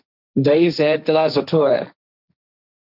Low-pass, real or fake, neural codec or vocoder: 5.4 kHz; fake; codec, 16 kHz, 1.1 kbps, Voila-Tokenizer